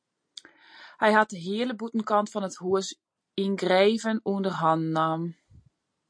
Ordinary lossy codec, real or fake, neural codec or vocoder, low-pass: MP3, 48 kbps; real; none; 9.9 kHz